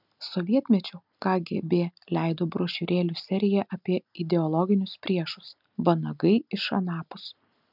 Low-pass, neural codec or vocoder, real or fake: 5.4 kHz; none; real